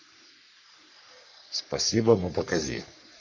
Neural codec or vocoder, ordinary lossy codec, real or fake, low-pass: codec, 44.1 kHz, 3.4 kbps, Pupu-Codec; MP3, 48 kbps; fake; 7.2 kHz